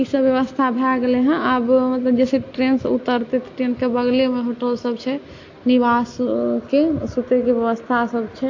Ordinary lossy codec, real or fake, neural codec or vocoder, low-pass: AAC, 48 kbps; real; none; 7.2 kHz